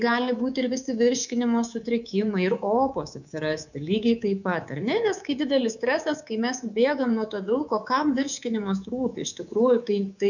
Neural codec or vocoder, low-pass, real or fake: codec, 24 kHz, 3.1 kbps, DualCodec; 7.2 kHz; fake